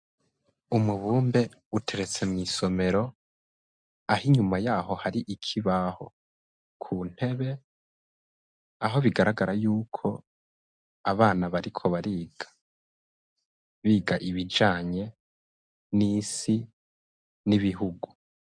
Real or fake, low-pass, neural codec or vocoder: real; 9.9 kHz; none